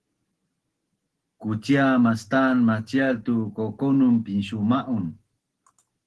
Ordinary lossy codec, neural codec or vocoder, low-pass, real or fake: Opus, 16 kbps; none; 10.8 kHz; real